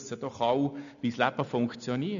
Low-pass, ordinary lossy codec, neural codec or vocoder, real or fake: 7.2 kHz; none; none; real